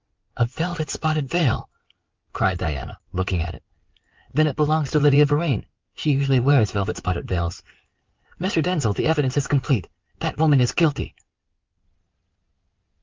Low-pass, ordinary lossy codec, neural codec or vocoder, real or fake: 7.2 kHz; Opus, 32 kbps; codec, 16 kHz in and 24 kHz out, 2.2 kbps, FireRedTTS-2 codec; fake